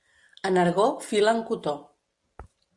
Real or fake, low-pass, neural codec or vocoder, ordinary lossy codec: real; 10.8 kHz; none; Opus, 64 kbps